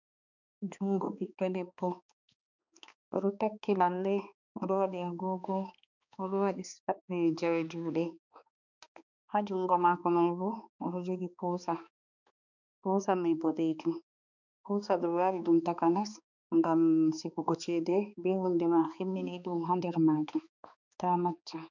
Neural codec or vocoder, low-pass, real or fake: codec, 16 kHz, 2 kbps, X-Codec, HuBERT features, trained on balanced general audio; 7.2 kHz; fake